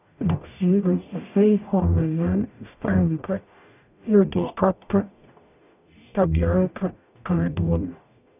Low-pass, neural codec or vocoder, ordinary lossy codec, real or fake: 3.6 kHz; codec, 44.1 kHz, 0.9 kbps, DAC; none; fake